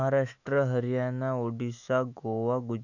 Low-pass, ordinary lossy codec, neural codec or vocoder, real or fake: 7.2 kHz; none; autoencoder, 48 kHz, 128 numbers a frame, DAC-VAE, trained on Japanese speech; fake